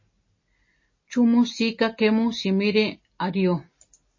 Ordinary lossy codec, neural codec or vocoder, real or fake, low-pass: MP3, 32 kbps; none; real; 7.2 kHz